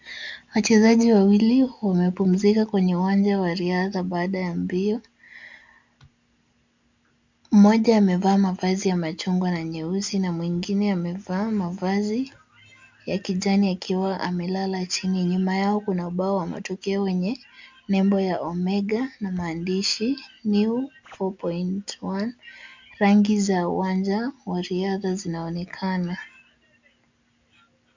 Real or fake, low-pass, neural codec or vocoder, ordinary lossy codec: real; 7.2 kHz; none; MP3, 64 kbps